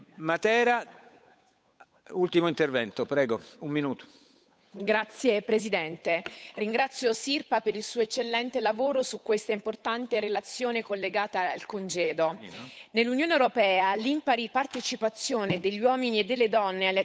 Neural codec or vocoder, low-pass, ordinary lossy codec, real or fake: codec, 16 kHz, 8 kbps, FunCodec, trained on Chinese and English, 25 frames a second; none; none; fake